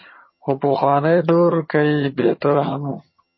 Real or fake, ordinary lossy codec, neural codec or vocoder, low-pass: fake; MP3, 24 kbps; vocoder, 22.05 kHz, 80 mel bands, HiFi-GAN; 7.2 kHz